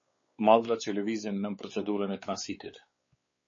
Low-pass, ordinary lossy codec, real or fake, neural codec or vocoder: 7.2 kHz; MP3, 32 kbps; fake; codec, 16 kHz, 4 kbps, X-Codec, WavLM features, trained on Multilingual LibriSpeech